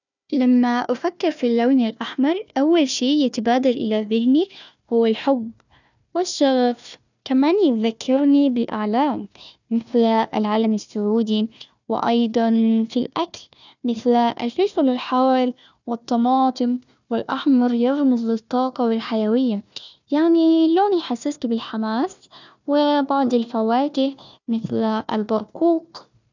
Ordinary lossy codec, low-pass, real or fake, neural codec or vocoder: none; 7.2 kHz; fake; codec, 16 kHz, 1 kbps, FunCodec, trained on Chinese and English, 50 frames a second